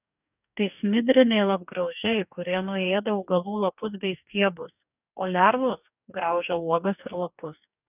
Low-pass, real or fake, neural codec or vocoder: 3.6 kHz; fake; codec, 44.1 kHz, 2.6 kbps, DAC